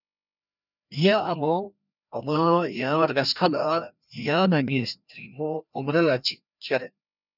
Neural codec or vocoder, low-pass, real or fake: codec, 16 kHz, 1 kbps, FreqCodec, larger model; 5.4 kHz; fake